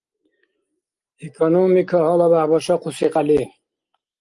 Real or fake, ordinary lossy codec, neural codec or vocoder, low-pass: real; Opus, 32 kbps; none; 10.8 kHz